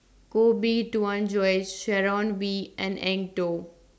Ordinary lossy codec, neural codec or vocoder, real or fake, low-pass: none; none; real; none